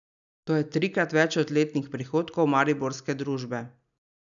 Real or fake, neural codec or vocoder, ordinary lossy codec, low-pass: real; none; none; 7.2 kHz